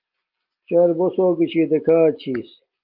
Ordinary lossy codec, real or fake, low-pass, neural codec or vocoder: Opus, 24 kbps; real; 5.4 kHz; none